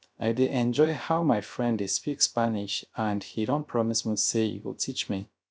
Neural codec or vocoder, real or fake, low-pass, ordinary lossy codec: codec, 16 kHz, 0.3 kbps, FocalCodec; fake; none; none